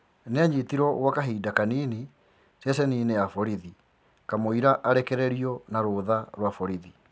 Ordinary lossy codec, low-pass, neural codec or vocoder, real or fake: none; none; none; real